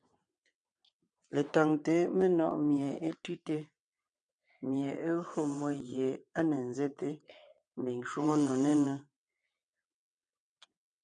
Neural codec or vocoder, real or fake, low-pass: vocoder, 22.05 kHz, 80 mel bands, WaveNeXt; fake; 9.9 kHz